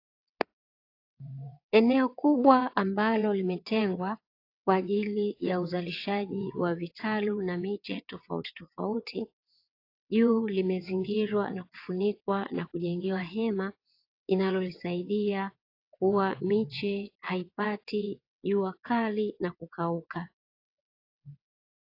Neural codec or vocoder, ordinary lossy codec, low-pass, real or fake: vocoder, 22.05 kHz, 80 mel bands, WaveNeXt; AAC, 32 kbps; 5.4 kHz; fake